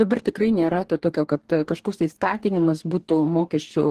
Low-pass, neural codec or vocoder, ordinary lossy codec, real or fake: 14.4 kHz; codec, 44.1 kHz, 2.6 kbps, DAC; Opus, 16 kbps; fake